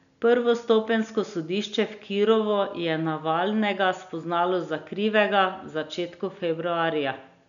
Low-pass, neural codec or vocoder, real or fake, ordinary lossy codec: 7.2 kHz; none; real; none